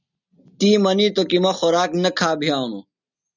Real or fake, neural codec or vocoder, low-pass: real; none; 7.2 kHz